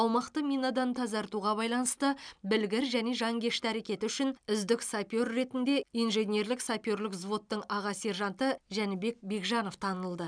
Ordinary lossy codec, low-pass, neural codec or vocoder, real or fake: none; 9.9 kHz; none; real